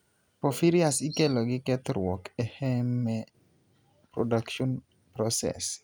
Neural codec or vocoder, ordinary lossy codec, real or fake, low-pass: none; none; real; none